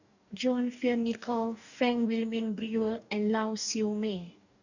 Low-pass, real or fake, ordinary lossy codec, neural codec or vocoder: 7.2 kHz; fake; none; codec, 44.1 kHz, 2.6 kbps, DAC